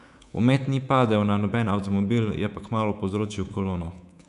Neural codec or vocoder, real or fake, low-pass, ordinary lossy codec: codec, 24 kHz, 3.1 kbps, DualCodec; fake; 10.8 kHz; none